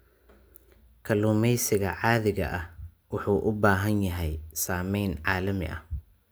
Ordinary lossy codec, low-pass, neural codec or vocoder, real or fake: none; none; none; real